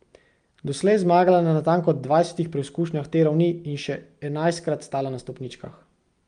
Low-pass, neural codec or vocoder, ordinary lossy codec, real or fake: 9.9 kHz; none; Opus, 32 kbps; real